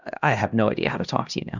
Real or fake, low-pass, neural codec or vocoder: fake; 7.2 kHz; codec, 16 kHz, 1 kbps, X-Codec, HuBERT features, trained on LibriSpeech